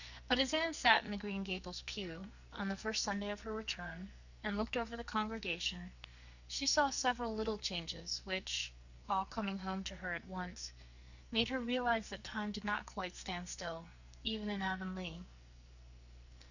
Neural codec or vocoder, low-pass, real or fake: codec, 44.1 kHz, 2.6 kbps, SNAC; 7.2 kHz; fake